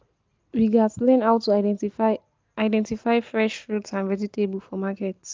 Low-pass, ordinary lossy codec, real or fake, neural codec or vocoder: 7.2 kHz; Opus, 32 kbps; real; none